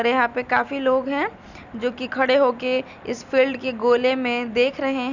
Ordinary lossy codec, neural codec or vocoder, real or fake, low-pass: none; none; real; 7.2 kHz